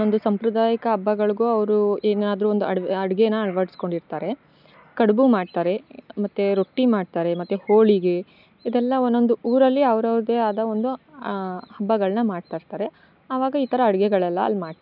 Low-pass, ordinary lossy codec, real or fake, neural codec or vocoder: 5.4 kHz; none; real; none